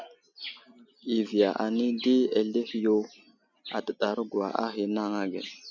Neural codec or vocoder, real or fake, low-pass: none; real; 7.2 kHz